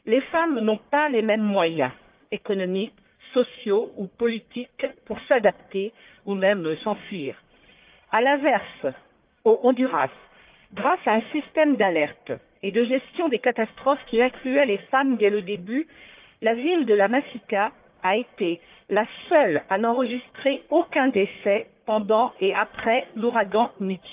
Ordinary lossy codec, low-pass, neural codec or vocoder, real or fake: Opus, 24 kbps; 3.6 kHz; codec, 44.1 kHz, 1.7 kbps, Pupu-Codec; fake